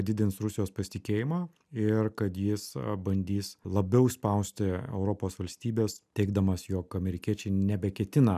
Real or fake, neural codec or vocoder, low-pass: real; none; 14.4 kHz